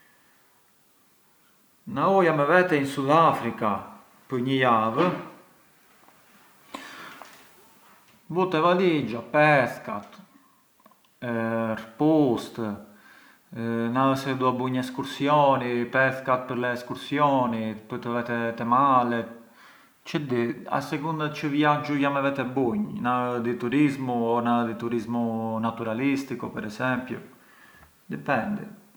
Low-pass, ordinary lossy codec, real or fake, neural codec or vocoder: none; none; real; none